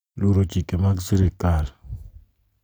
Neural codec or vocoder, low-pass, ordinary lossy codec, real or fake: none; none; none; real